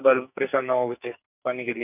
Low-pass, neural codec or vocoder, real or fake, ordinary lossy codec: 3.6 kHz; codec, 44.1 kHz, 2.6 kbps, SNAC; fake; none